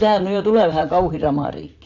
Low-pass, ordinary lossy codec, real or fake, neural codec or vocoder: 7.2 kHz; none; fake; vocoder, 44.1 kHz, 80 mel bands, Vocos